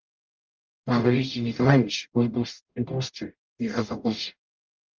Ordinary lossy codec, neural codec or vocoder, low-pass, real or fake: Opus, 32 kbps; codec, 44.1 kHz, 0.9 kbps, DAC; 7.2 kHz; fake